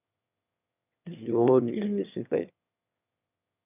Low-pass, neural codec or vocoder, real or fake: 3.6 kHz; autoencoder, 22.05 kHz, a latent of 192 numbers a frame, VITS, trained on one speaker; fake